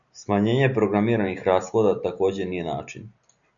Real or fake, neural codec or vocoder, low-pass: real; none; 7.2 kHz